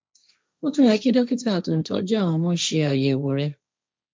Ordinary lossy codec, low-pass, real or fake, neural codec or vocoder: none; none; fake; codec, 16 kHz, 1.1 kbps, Voila-Tokenizer